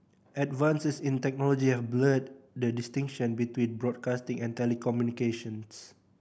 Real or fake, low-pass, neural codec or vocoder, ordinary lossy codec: real; none; none; none